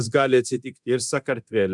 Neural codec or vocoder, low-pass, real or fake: codec, 24 kHz, 0.9 kbps, DualCodec; 10.8 kHz; fake